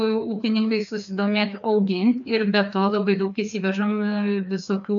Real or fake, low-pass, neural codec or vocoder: fake; 7.2 kHz; codec, 16 kHz, 2 kbps, FreqCodec, larger model